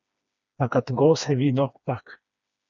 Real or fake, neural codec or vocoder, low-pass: fake; codec, 16 kHz, 2 kbps, FreqCodec, smaller model; 7.2 kHz